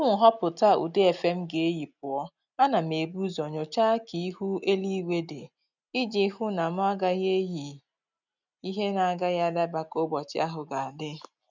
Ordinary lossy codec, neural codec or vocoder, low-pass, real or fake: none; none; 7.2 kHz; real